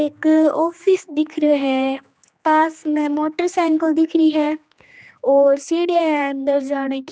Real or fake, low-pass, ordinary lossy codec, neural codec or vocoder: fake; none; none; codec, 16 kHz, 2 kbps, X-Codec, HuBERT features, trained on general audio